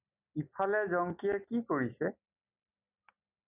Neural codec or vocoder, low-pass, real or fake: none; 3.6 kHz; real